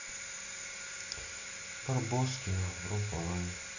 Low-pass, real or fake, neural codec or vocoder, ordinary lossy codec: 7.2 kHz; real; none; none